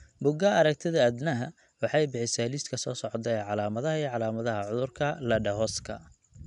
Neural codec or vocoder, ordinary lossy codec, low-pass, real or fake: none; none; 10.8 kHz; real